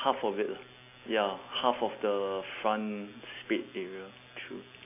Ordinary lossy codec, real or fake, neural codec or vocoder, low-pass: AAC, 24 kbps; real; none; 3.6 kHz